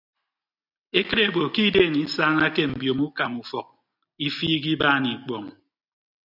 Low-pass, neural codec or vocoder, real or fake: 5.4 kHz; none; real